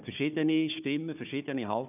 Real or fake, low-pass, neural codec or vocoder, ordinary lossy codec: fake; 3.6 kHz; codec, 16 kHz, 4 kbps, FunCodec, trained on Chinese and English, 50 frames a second; none